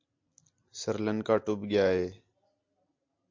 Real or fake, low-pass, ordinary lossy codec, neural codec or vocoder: real; 7.2 kHz; MP3, 48 kbps; none